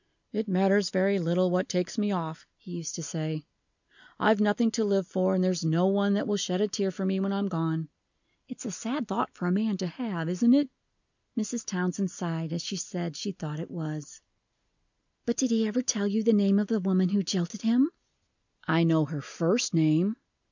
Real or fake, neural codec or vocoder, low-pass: real; none; 7.2 kHz